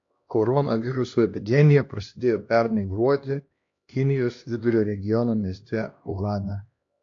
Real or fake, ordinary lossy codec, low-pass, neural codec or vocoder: fake; AAC, 48 kbps; 7.2 kHz; codec, 16 kHz, 1 kbps, X-Codec, HuBERT features, trained on LibriSpeech